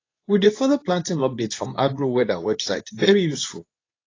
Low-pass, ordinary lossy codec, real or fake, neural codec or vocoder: 7.2 kHz; AAC, 32 kbps; fake; codec, 24 kHz, 0.9 kbps, WavTokenizer, medium speech release version 2